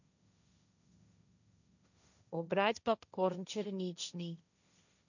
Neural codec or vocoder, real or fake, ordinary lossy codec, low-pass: codec, 16 kHz, 1.1 kbps, Voila-Tokenizer; fake; none; 7.2 kHz